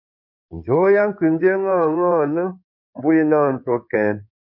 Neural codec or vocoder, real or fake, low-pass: codec, 16 kHz in and 24 kHz out, 2.2 kbps, FireRedTTS-2 codec; fake; 5.4 kHz